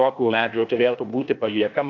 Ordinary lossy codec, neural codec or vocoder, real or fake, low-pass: AAC, 48 kbps; codec, 16 kHz, 0.8 kbps, ZipCodec; fake; 7.2 kHz